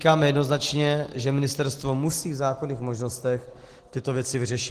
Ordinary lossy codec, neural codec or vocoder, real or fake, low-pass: Opus, 16 kbps; none; real; 14.4 kHz